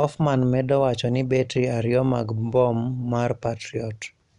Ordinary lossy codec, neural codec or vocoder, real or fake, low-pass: none; none; real; 10.8 kHz